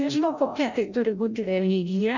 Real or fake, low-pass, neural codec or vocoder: fake; 7.2 kHz; codec, 16 kHz, 0.5 kbps, FreqCodec, larger model